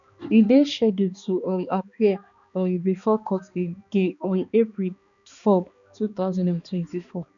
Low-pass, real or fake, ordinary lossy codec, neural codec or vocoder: 7.2 kHz; fake; none; codec, 16 kHz, 2 kbps, X-Codec, HuBERT features, trained on balanced general audio